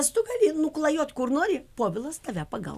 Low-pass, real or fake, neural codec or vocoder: 14.4 kHz; real; none